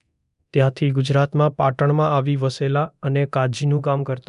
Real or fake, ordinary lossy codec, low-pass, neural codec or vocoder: fake; none; 10.8 kHz; codec, 24 kHz, 0.9 kbps, DualCodec